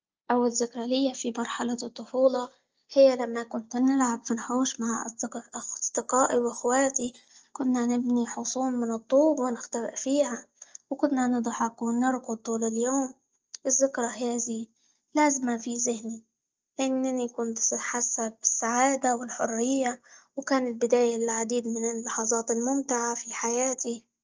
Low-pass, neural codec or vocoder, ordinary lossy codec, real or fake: 7.2 kHz; none; Opus, 24 kbps; real